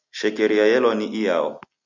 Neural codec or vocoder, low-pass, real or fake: none; 7.2 kHz; real